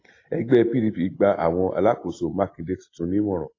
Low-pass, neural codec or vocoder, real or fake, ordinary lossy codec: 7.2 kHz; none; real; AAC, 32 kbps